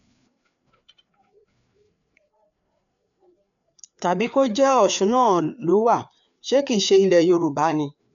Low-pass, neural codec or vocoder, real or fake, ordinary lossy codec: 7.2 kHz; codec, 16 kHz, 4 kbps, FreqCodec, larger model; fake; none